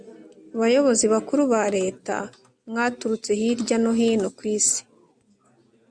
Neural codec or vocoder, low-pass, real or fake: none; 9.9 kHz; real